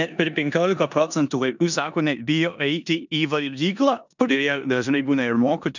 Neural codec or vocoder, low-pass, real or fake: codec, 16 kHz in and 24 kHz out, 0.9 kbps, LongCat-Audio-Codec, four codebook decoder; 7.2 kHz; fake